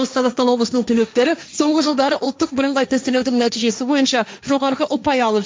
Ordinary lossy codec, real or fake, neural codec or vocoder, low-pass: none; fake; codec, 16 kHz, 1.1 kbps, Voila-Tokenizer; none